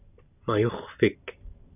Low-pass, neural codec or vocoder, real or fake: 3.6 kHz; none; real